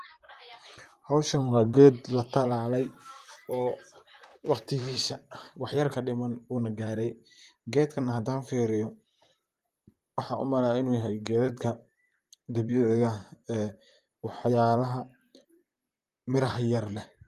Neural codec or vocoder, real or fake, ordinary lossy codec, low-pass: vocoder, 44.1 kHz, 128 mel bands, Pupu-Vocoder; fake; Opus, 32 kbps; 14.4 kHz